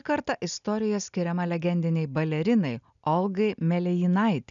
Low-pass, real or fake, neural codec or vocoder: 7.2 kHz; real; none